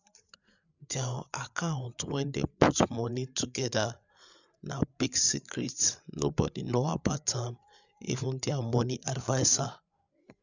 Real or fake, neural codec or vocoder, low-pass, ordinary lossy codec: fake; codec, 16 kHz, 8 kbps, FreqCodec, larger model; 7.2 kHz; none